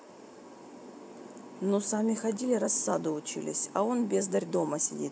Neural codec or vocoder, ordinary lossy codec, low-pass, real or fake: none; none; none; real